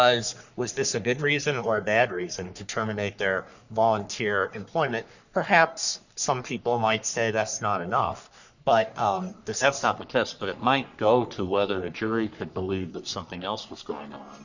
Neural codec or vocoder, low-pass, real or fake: codec, 44.1 kHz, 3.4 kbps, Pupu-Codec; 7.2 kHz; fake